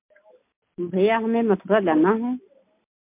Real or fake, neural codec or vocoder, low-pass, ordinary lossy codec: real; none; 3.6 kHz; MP3, 32 kbps